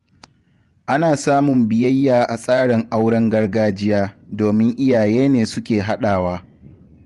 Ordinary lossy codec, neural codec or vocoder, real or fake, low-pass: Opus, 32 kbps; vocoder, 24 kHz, 100 mel bands, Vocos; fake; 10.8 kHz